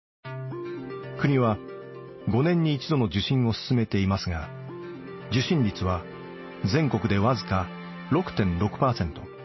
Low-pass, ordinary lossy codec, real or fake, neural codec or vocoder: 7.2 kHz; MP3, 24 kbps; real; none